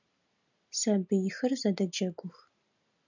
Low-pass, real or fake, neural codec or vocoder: 7.2 kHz; real; none